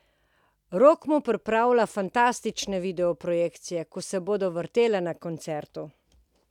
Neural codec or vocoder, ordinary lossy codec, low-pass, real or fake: none; none; 19.8 kHz; real